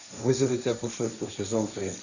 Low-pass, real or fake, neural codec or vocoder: 7.2 kHz; fake; codec, 16 kHz, 1.1 kbps, Voila-Tokenizer